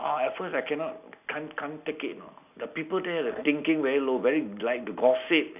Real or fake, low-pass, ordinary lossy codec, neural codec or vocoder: real; 3.6 kHz; none; none